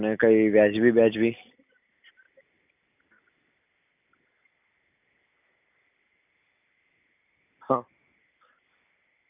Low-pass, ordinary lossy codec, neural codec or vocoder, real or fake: 3.6 kHz; none; none; real